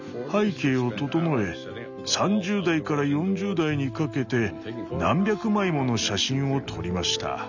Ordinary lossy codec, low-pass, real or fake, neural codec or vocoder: none; 7.2 kHz; real; none